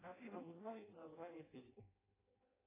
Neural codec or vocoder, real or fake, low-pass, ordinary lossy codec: codec, 16 kHz in and 24 kHz out, 0.6 kbps, FireRedTTS-2 codec; fake; 3.6 kHz; AAC, 16 kbps